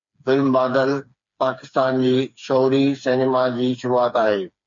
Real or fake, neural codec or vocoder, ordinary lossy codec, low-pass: fake; codec, 16 kHz, 4 kbps, FreqCodec, smaller model; MP3, 48 kbps; 7.2 kHz